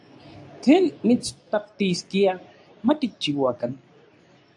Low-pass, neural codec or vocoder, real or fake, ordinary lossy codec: 10.8 kHz; codec, 24 kHz, 0.9 kbps, WavTokenizer, medium speech release version 2; fake; AAC, 64 kbps